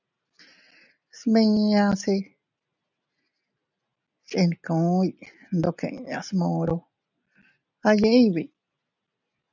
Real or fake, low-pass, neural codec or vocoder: real; 7.2 kHz; none